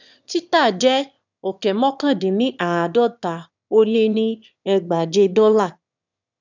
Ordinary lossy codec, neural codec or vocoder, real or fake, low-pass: none; autoencoder, 22.05 kHz, a latent of 192 numbers a frame, VITS, trained on one speaker; fake; 7.2 kHz